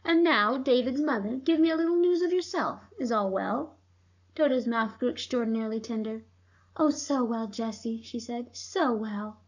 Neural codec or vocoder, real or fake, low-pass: codec, 44.1 kHz, 7.8 kbps, Pupu-Codec; fake; 7.2 kHz